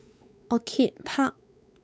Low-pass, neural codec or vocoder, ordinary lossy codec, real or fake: none; codec, 16 kHz, 4 kbps, X-Codec, WavLM features, trained on Multilingual LibriSpeech; none; fake